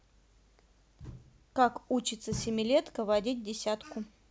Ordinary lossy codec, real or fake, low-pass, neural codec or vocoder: none; real; none; none